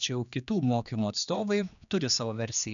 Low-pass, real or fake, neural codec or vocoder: 7.2 kHz; fake; codec, 16 kHz, 2 kbps, X-Codec, HuBERT features, trained on general audio